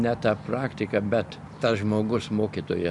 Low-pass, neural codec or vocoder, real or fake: 10.8 kHz; none; real